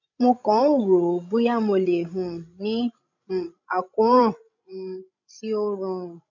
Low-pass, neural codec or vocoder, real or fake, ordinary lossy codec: 7.2 kHz; codec, 16 kHz, 16 kbps, FreqCodec, larger model; fake; none